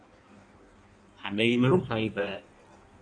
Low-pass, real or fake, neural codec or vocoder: 9.9 kHz; fake; codec, 16 kHz in and 24 kHz out, 1.1 kbps, FireRedTTS-2 codec